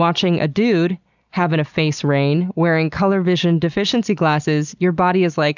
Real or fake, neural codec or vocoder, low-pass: real; none; 7.2 kHz